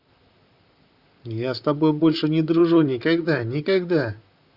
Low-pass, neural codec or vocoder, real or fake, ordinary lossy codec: 5.4 kHz; vocoder, 44.1 kHz, 128 mel bands, Pupu-Vocoder; fake; Opus, 64 kbps